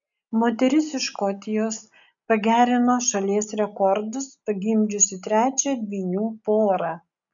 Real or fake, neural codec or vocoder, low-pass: real; none; 7.2 kHz